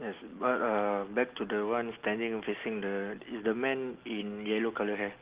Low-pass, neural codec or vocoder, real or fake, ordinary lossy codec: 3.6 kHz; none; real; Opus, 16 kbps